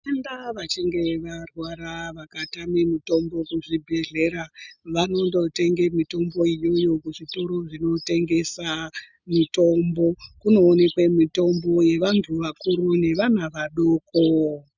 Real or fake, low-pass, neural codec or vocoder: real; 7.2 kHz; none